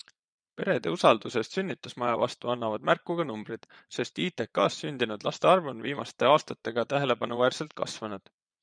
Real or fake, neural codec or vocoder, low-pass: fake; vocoder, 22.05 kHz, 80 mel bands, Vocos; 9.9 kHz